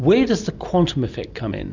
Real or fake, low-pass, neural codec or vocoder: real; 7.2 kHz; none